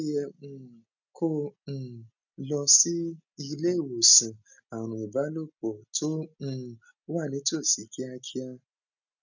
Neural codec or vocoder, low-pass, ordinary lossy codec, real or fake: none; 7.2 kHz; none; real